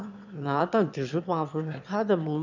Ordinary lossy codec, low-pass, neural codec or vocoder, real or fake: none; 7.2 kHz; autoencoder, 22.05 kHz, a latent of 192 numbers a frame, VITS, trained on one speaker; fake